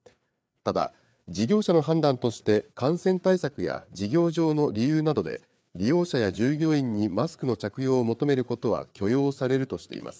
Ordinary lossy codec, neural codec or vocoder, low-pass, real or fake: none; codec, 16 kHz, 4 kbps, FreqCodec, larger model; none; fake